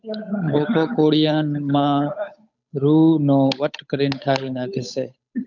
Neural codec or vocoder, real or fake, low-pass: codec, 16 kHz, 8 kbps, FunCodec, trained on Chinese and English, 25 frames a second; fake; 7.2 kHz